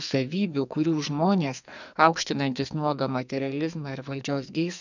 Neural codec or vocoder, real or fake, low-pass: codec, 44.1 kHz, 2.6 kbps, SNAC; fake; 7.2 kHz